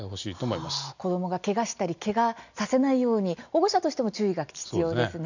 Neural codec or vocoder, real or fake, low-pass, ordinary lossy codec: none; real; 7.2 kHz; none